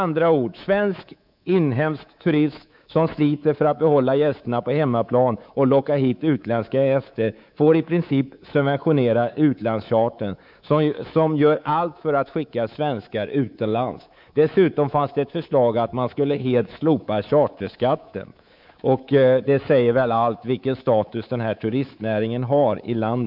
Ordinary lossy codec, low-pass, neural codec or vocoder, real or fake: none; 5.4 kHz; codec, 24 kHz, 3.1 kbps, DualCodec; fake